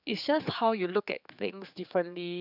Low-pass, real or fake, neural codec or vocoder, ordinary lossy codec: 5.4 kHz; fake; codec, 16 kHz, 4 kbps, X-Codec, HuBERT features, trained on general audio; none